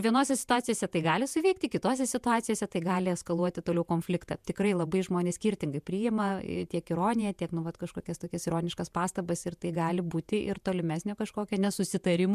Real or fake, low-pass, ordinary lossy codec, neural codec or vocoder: fake; 14.4 kHz; MP3, 96 kbps; vocoder, 48 kHz, 128 mel bands, Vocos